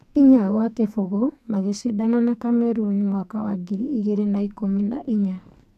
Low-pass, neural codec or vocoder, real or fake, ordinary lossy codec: 14.4 kHz; codec, 44.1 kHz, 2.6 kbps, SNAC; fake; none